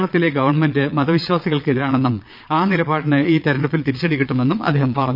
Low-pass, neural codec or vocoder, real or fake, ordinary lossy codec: 5.4 kHz; vocoder, 22.05 kHz, 80 mel bands, Vocos; fake; none